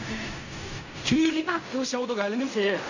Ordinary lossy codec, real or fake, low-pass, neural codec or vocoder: none; fake; 7.2 kHz; codec, 16 kHz in and 24 kHz out, 0.4 kbps, LongCat-Audio-Codec, fine tuned four codebook decoder